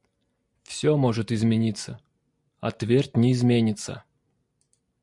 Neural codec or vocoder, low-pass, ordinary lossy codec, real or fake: none; 10.8 kHz; Opus, 64 kbps; real